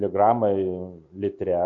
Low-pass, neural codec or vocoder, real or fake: 7.2 kHz; none; real